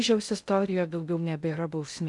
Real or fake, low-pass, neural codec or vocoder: fake; 10.8 kHz; codec, 16 kHz in and 24 kHz out, 0.6 kbps, FocalCodec, streaming, 2048 codes